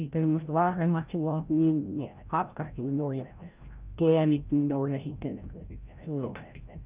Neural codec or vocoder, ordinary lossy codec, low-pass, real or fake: codec, 16 kHz, 0.5 kbps, FreqCodec, larger model; Opus, 24 kbps; 3.6 kHz; fake